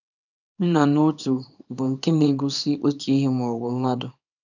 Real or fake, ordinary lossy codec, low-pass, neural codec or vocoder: fake; none; 7.2 kHz; codec, 24 kHz, 0.9 kbps, WavTokenizer, small release